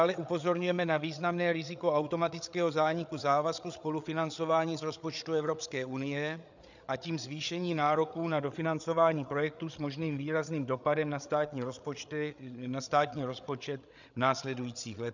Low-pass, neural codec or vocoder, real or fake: 7.2 kHz; codec, 16 kHz, 8 kbps, FreqCodec, larger model; fake